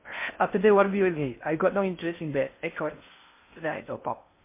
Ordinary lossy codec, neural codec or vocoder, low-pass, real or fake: MP3, 24 kbps; codec, 16 kHz in and 24 kHz out, 0.6 kbps, FocalCodec, streaming, 2048 codes; 3.6 kHz; fake